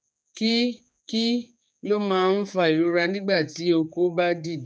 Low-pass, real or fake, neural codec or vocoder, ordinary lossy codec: none; fake; codec, 16 kHz, 4 kbps, X-Codec, HuBERT features, trained on general audio; none